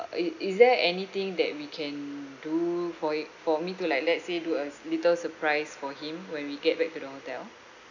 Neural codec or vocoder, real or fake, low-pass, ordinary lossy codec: none; real; 7.2 kHz; none